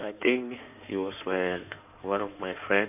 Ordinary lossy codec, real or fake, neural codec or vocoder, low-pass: none; fake; codec, 16 kHz in and 24 kHz out, 2.2 kbps, FireRedTTS-2 codec; 3.6 kHz